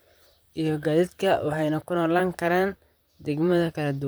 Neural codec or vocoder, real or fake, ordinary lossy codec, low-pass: vocoder, 44.1 kHz, 128 mel bands, Pupu-Vocoder; fake; none; none